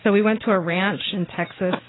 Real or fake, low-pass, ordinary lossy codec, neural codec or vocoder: real; 7.2 kHz; AAC, 16 kbps; none